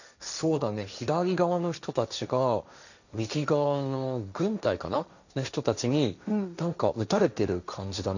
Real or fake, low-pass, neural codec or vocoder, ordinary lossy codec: fake; 7.2 kHz; codec, 16 kHz, 1.1 kbps, Voila-Tokenizer; none